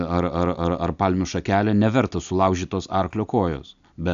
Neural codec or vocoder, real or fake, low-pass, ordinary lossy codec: none; real; 7.2 kHz; AAC, 96 kbps